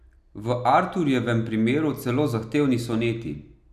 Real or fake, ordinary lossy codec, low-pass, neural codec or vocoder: real; none; 14.4 kHz; none